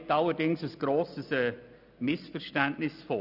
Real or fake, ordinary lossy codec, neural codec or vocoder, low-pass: real; none; none; 5.4 kHz